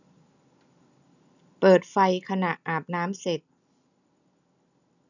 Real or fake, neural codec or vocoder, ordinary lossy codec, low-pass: real; none; none; 7.2 kHz